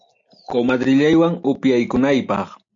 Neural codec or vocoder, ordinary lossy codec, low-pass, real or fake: none; AAC, 64 kbps; 7.2 kHz; real